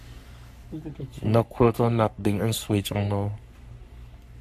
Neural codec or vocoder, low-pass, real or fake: codec, 44.1 kHz, 3.4 kbps, Pupu-Codec; 14.4 kHz; fake